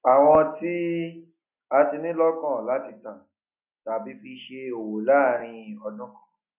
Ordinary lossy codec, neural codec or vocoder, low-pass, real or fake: none; none; 3.6 kHz; real